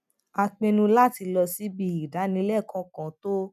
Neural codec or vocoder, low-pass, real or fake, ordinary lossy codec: none; 14.4 kHz; real; none